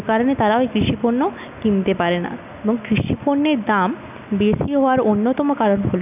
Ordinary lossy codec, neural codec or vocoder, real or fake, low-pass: none; none; real; 3.6 kHz